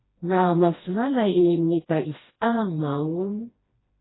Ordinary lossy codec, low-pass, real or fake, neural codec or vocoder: AAC, 16 kbps; 7.2 kHz; fake; codec, 16 kHz, 1 kbps, FreqCodec, smaller model